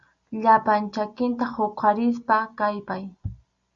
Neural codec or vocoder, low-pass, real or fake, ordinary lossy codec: none; 7.2 kHz; real; Opus, 64 kbps